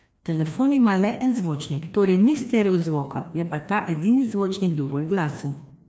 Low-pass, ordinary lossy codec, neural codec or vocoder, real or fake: none; none; codec, 16 kHz, 1 kbps, FreqCodec, larger model; fake